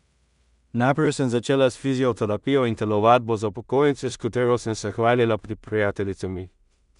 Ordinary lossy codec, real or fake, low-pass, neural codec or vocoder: none; fake; 10.8 kHz; codec, 16 kHz in and 24 kHz out, 0.4 kbps, LongCat-Audio-Codec, two codebook decoder